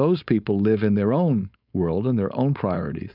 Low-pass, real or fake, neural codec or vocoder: 5.4 kHz; fake; codec, 16 kHz, 4.8 kbps, FACodec